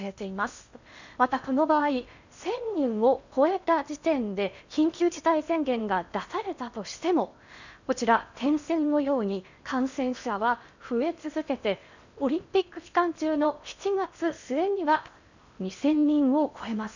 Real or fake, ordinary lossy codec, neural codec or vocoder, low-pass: fake; none; codec, 16 kHz in and 24 kHz out, 0.8 kbps, FocalCodec, streaming, 65536 codes; 7.2 kHz